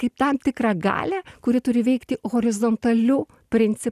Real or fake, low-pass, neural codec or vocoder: real; 14.4 kHz; none